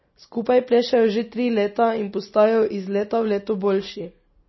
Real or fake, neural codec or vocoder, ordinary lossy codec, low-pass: real; none; MP3, 24 kbps; 7.2 kHz